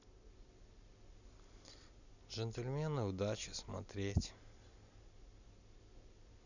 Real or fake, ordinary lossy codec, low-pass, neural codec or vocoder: real; none; 7.2 kHz; none